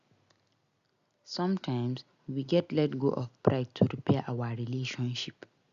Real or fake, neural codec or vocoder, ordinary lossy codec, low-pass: real; none; Opus, 64 kbps; 7.2 kHz